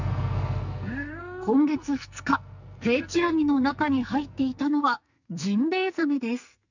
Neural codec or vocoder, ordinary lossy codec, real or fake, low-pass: codec, 44.1 kHz, 2.6 kbps, SNAC; none; fake; 7.2 kHz